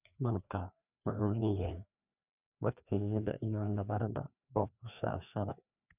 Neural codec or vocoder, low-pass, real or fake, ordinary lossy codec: codec, 16 kHz, 2 kbps, FreqCodec, larger model; 3.6 kHz; fake; none